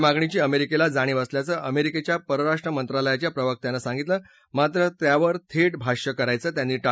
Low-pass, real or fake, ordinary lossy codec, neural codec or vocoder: none; real; none; none